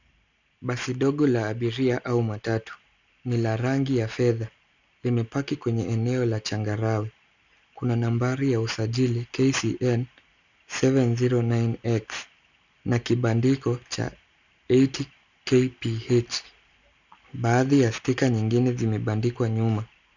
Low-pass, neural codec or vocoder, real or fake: 7.2 kHz; none; real